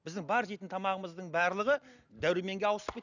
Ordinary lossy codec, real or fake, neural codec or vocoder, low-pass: none; real; none; 7.2 kHz